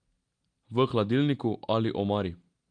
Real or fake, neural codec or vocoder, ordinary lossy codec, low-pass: real; none; Opus, 32 kbps; 9.9 kHz